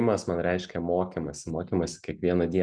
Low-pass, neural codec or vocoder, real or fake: 9.9 kHz; none; real